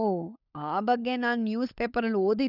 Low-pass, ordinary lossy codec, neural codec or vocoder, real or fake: 5.4 kHz; none; codec, 16 kHz, 16 kbps, FunCodec, trained on LibriTTS, 50 frames a second; fake